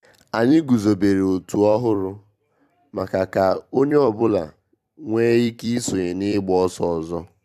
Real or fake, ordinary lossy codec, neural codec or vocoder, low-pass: fake; AAC, 96 kbps; vocoder, 44.1 kHz, 128 mel bands every 256 samples, BigVGAN v2; 14.4 kHz